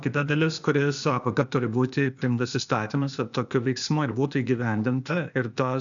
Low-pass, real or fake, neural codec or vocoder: 7.2 kHz; fake; codec, 16 kHz, 0.8 kbps, ZipCodec